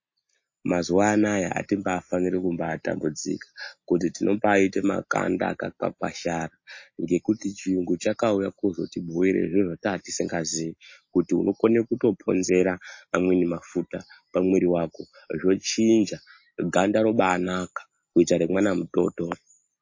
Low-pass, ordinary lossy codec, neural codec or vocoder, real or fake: 7.2 kHz; MP3, 32 kbps; none; real